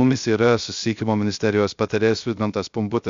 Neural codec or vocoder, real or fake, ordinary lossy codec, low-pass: codec, 16 kHz, 0.3 kbps, FocalCodec; fake; MP3, 64 kbps; 7.2 kHz